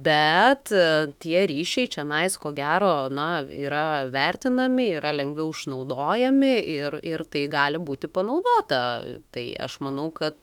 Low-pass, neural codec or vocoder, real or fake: 19.8 kHz; autoencoder, 48 kHz, 32 numbers a frame, DAC-VAE, trained on Japanese speech; fake